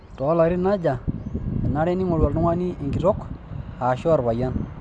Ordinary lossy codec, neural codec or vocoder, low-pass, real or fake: none; none; 9.9 kHz; real